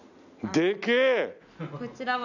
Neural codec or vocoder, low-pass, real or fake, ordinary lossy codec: none; 7.2 kHz; real; none